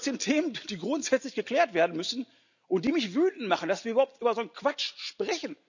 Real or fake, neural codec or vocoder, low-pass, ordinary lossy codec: real; none; 7.2 kHz; none